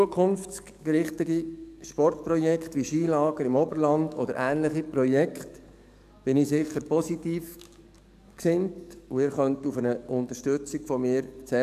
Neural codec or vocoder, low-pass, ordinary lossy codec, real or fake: codec, 44.1 kHz, 7.8 kbps, DAC; 14.4 kHz; none; fake